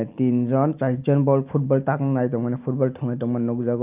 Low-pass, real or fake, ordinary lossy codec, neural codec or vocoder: 3.6 kHz; real; Opus, 32 kbps; none